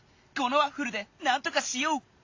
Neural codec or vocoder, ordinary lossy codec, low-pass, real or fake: none; AAC, 48 kbps; 7.2 kHz; real